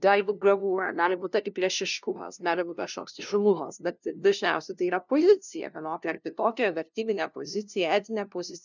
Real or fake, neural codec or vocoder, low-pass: fake; codec, 16 kHz, 0.5 kbps, FunCodec, trained on LibriTTS, 25 frames a second; 7.2 kHz